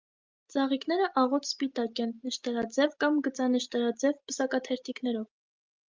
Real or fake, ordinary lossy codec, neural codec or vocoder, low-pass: real; Opus, 24 kbps; none; 7.2 kHz